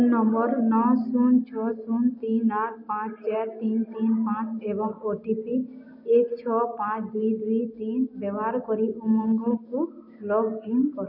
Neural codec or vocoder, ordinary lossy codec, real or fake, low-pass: none; MP3, 32 kbps; real; 5.4 kHz